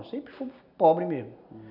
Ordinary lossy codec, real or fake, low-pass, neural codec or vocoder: none; real; 5.4 kHz; none